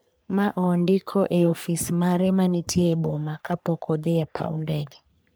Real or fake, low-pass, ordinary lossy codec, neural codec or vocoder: fake; none; none; codec, 44.1 kHz, 3.4 kbps, Pupu-Codec